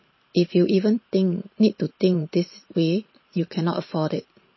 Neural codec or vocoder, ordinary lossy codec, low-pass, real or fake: vocoder, 44.1 kHz, 128 mel bands every 512 samples, BigVGAN v2; MP3, 24 kbps; 7.2 kHz; fake